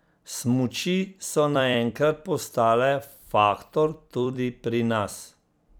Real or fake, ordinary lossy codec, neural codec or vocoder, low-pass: fake; none; vocoder, 44.1 kHz, 128 mel bands every 256 samples, BigVGAN v2; none